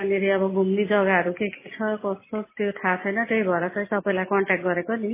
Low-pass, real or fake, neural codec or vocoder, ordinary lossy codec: 3.6 kHz; real; none; MP3, 16 kbps